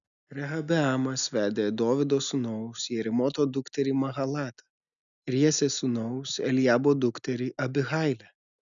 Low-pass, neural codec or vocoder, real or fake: 7.2 kHz; none; real